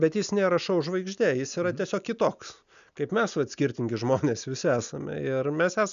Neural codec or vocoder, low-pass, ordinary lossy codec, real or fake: none; 7.2 kHz; MP3, 96 kbps; real